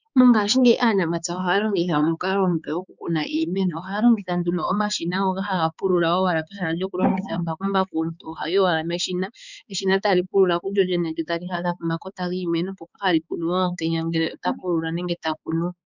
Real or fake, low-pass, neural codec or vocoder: fake; 7.2 kHz; codec, 16 kHz, 4 kbps, X-Codec, HuBERT features, trained on balanced general audio